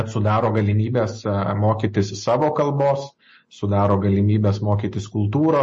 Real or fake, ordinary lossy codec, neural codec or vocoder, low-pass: real; MP3, 32 kbps; none; 9.9 kHz